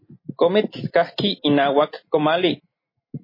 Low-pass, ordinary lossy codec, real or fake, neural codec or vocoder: 5.4 kHz; MP3, 24 kbps; real; none